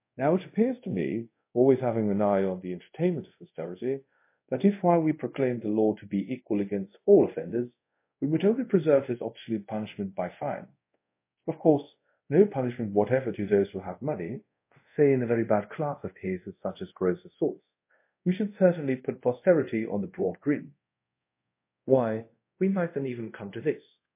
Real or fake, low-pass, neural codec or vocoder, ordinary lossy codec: fake; 3.6 kHz; codec, 24 kHz, 0.5 kbps, DualCodec; MP3, 24 kbps